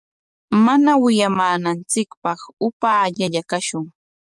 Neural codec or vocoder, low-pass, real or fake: codec, 44.1 kHz, 7.8 kbps, DAC; 10.8 kHz; fake